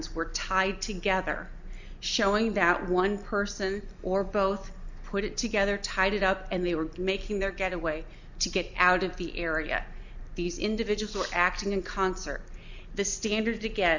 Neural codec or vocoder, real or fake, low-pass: none; real; 7.2 kHz